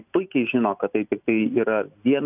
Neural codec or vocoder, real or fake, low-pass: none; real; 3.6 kHz